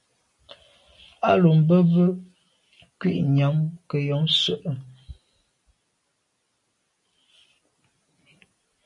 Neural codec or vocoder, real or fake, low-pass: none; real; 10.8 kHz